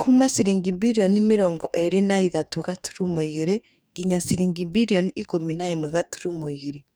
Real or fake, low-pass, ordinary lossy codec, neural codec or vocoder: fake; none; none; codec, 44.1 kHz, 2.6 kbps, DAC